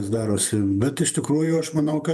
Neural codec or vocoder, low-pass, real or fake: vocoder, 48 kHz, 128 mel bands, Vocos; 14.4 kHz; fake